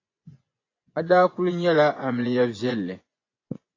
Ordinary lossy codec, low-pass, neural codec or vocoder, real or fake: AAC, 32 kbps; 7.2 kHz; vocoder, 24 kHz, 100 mel bands, Vocos; fake